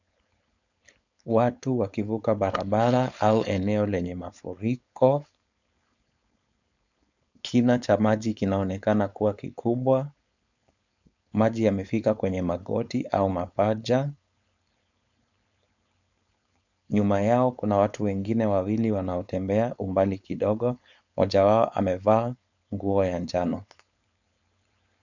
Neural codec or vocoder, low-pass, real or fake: codec, 16 kHz, 4.8 kbps, FACodec; 7.2 kHz; fake